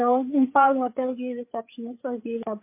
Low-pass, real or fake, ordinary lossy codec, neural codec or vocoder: 3.6 kHz; fake; MP3, 24 kbps; codec, 16 kHz, 4 kbps, FreqCodec, larger model